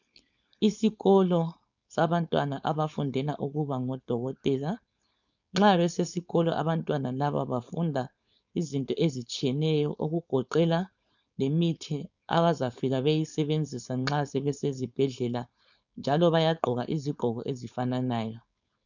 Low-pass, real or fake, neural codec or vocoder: 7.2 kHz; fake; codec, 16 kHz, 4.8 kbps, FACodec